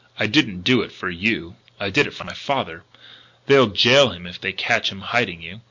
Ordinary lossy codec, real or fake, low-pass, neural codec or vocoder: MP3, 48 kbps; real; 7.2 kHz; none